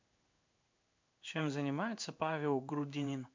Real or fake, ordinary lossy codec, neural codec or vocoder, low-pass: fake; MP3, 64 kbps; codec, 16 kHz in and 24 kHz out, 1 kbps, XY-Tokenizer; 7.2 kHz